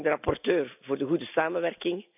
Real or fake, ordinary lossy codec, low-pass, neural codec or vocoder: real; none; 3.6 kHz; none